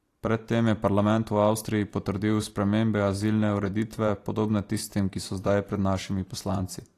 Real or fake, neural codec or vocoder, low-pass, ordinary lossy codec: fake; vocoder, 44.1 kHz, 128 mel bands every 512 samples, BigVGAN v2; 14.4 kHz; AAC, 48 kbps